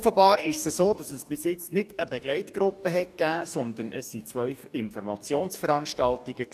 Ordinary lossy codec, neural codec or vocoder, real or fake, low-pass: none; codec, 44.1 kHz, 2.6 kbps, DAC; fake; 14.4 kHz